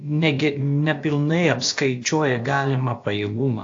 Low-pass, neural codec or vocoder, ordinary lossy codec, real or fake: 7.2 kHz; codec, 16 kHz, about 1 kbps, DyCAST, with the encoder's durations; AAC, 48 kbps; fake